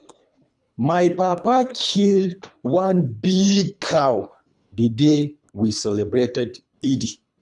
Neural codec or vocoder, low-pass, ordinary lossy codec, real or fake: codec, 24 kHz, 3 kbps, HILCodec; 10.8 kHz; none; fake